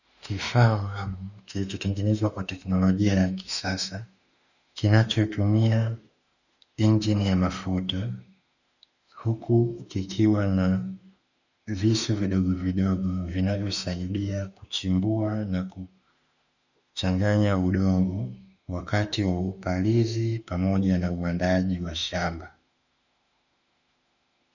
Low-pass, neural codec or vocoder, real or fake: 7.2 kHz; autoencoder, 48 kHz, 32 numbers a frame, DAC-VAE, trained on Japanese speech; fake